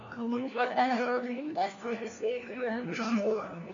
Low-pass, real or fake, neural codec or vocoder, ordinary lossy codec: 7.2 kHz; fake; codec, 16 kHz, 1 kbps, FunCodec, trained on LibriTTS, 50 frames a second; MP3, 64 kbps